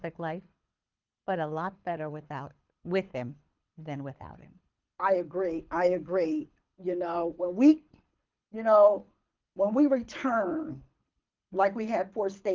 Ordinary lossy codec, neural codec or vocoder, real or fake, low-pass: Opus, 32 kbps; codec, 24 kHz, 6 kbps, HILCodec; fake; 7.2 kHz